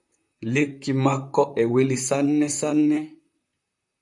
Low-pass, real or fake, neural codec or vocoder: 10.8 kHz; fake; vocoder, 44.1 kHz, 128 mel bands, Pupu-Vocoder